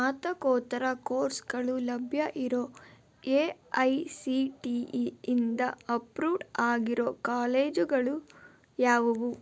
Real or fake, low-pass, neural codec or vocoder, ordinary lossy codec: real; none; none; none